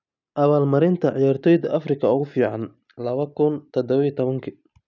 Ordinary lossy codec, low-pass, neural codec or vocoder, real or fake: none; 7.2 kHz; none; real